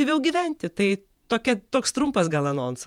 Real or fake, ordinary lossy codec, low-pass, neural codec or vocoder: fake; MP3, 96 kbps; 19.8 kHz; vocoder, 48 kHz, 128 mel bands, Vocos